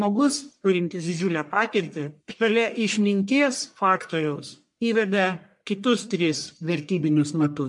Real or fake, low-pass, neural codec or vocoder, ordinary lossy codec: fake; 10.8 kHz; codec, 44.1 kHz, 1.7 kbps, Pupu-Codec; MP3, 64 kbps